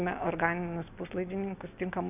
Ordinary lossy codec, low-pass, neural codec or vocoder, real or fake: AAC, 32 kbps; 3.6 kHz; none; real